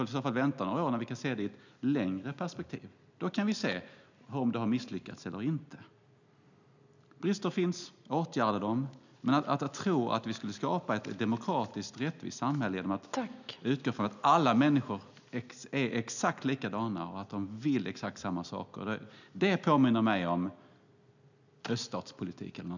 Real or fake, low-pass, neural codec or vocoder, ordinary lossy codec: real; 7.2 kHz; none; none